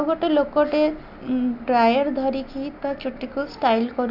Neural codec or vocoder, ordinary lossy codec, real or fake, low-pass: none; none; real; 5.4 kHz